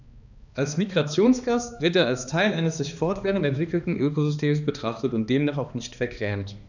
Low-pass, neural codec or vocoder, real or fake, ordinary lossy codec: 7.2 kHz; codec, 16 kHz, 2 kbps, X-Codec, HuBERT features, trained on balanced general audio; fake; none